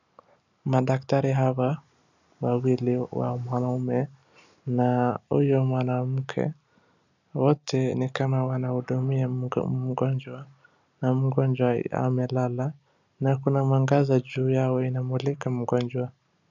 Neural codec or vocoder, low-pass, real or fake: none; 7.2 kHz; real